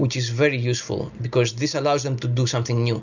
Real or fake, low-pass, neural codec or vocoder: real; 7.2 kHz; none